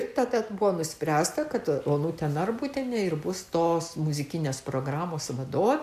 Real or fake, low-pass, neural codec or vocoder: real; 14.4 kHz; none